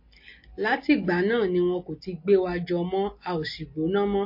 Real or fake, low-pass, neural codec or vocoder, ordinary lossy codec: real; 5.4 kHz; none; MP3, 32 kbps